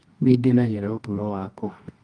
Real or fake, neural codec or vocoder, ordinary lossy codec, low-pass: fake; codec, 24 kHz, 0.9 kbps, WavTokenizer, medium music audio release; Opus, 24 kbps; 9.9 kHz